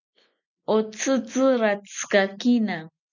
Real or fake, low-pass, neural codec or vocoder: real; 7.2 kHz; none